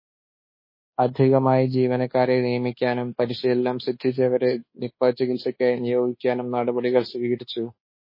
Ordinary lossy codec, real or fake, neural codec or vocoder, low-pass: MP3, 24 kbps; fake; codec, 24 kHz, 1.2 kbps, DualCodec; 5.4 kHz